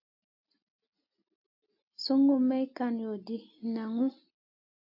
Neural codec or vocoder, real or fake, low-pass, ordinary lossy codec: none; real; 5.4 kHz; AAC, 48 kbps